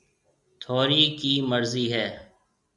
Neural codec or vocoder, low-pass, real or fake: none; 10.8 kHz; real